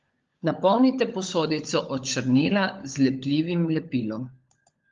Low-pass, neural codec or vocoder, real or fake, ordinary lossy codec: 7.2 kHz; codec, 16 kHz, 16 kbps, FunCodec, trained on LibriTTS, 50 frames a second; fake; Opus, 32 kbps